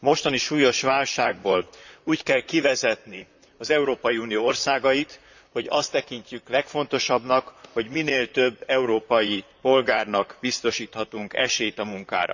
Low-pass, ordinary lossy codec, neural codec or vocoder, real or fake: 7.2 kHz; none; vocoder, 44.1 kHz, 128 mel bands, Pupu-Vocoder; fake